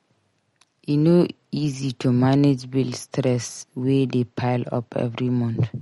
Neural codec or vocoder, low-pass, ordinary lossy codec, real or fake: none; 19.8 kHz; MP3, 48 kbps; real